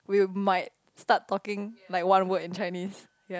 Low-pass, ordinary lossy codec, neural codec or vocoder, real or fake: none; none; none; real